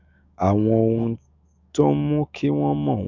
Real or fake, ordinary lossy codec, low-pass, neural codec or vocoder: fake; none; 7.2 kHz; autoencoder, 48 kHz, 128 numbers a frame, DAC-VAE, trained on Japanese speech